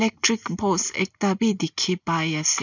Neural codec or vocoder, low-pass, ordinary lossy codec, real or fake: none; 7.2 kHz; none; real